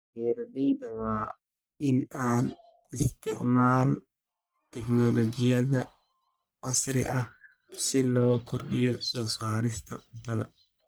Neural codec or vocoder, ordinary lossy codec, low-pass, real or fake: codec, 44.1 kHz, 1.7 kbps, Pupu-Codec; none; none; fake